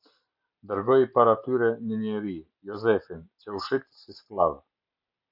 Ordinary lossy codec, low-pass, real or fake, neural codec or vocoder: MP3, 48 kbps; 5.4 kHz; real; none